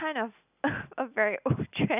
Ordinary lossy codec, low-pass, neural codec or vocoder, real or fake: none; 3.6 kHz; none; real